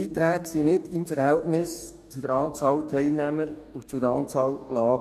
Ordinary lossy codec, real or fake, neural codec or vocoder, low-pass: none; fake; codec, 44.1 kHz, 2.6 kbps, DAC; 14.4 kHz